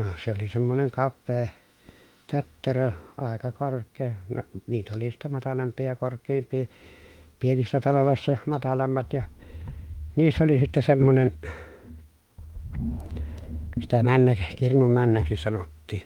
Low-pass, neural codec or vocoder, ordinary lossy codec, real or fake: 19.8 kHz; autoencoder, 48 kHz, 32 numbers a frame, DAC-VAE, trained on Japanese speech; Opus, 32 kbps; fake